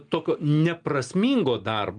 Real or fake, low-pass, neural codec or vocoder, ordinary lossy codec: real; 9.9 kHz; none; Opus, 32 kbps